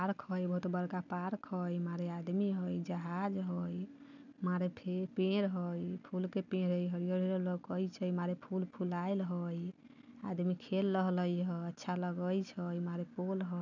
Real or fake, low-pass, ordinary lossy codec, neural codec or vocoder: real; 7.2 kHz; none; none